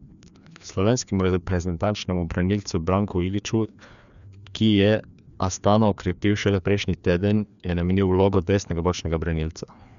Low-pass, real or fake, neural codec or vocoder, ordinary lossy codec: 7.2 kHz; fake; codec, 16 kHz, 2 kbps, FreqCodec, larger model; none